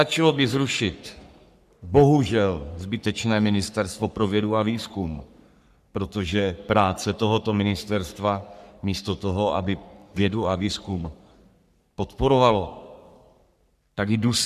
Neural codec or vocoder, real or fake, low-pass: codec, 44.1 kHz, 3.4 kbps, Pupu-Codec; fake; 14.4 kHz